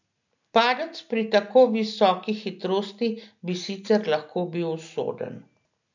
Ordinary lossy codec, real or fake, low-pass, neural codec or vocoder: none; real; 7.2 kHz; none